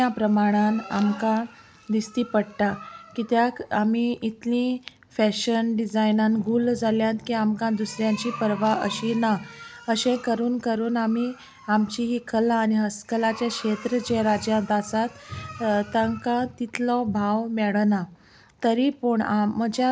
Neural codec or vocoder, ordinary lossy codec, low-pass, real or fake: none; none; none; real